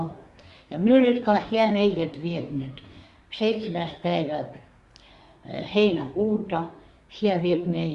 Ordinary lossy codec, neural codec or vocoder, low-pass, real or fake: Opus, 64 kbps; codec, 24 kHz, 1 kbps, SNAC; 10.8 kHz; fake